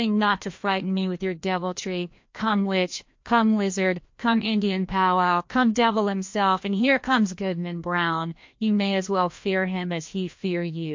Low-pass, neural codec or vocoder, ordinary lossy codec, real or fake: 7.2 kHz; codec, 16 kHz, 1 kbps, FreqCodec, larger model; MP3, 48 kbps; fake